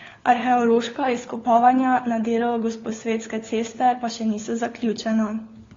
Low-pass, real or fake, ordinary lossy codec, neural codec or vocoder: 7.2 kHz; fake; AAC, 32 kbps; codec, 16 kHz, 4 kbps, FunCodec, trained on LibriTTS, 50 frames a second